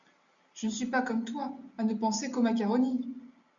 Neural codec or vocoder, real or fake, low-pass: none; real; 7.2 kHz